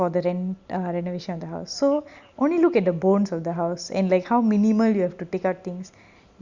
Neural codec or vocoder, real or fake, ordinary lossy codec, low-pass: none; real; Opus, 64 kbps; 7.2 kHz